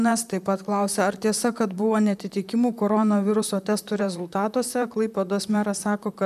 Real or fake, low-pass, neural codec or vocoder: fake; 14.4 kHz; vocoder, 44.1 kHz, 128 mel bands, Pupu-Vocoder